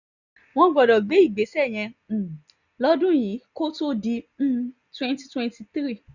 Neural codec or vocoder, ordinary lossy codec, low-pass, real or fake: none; none; 7.2 kHz; real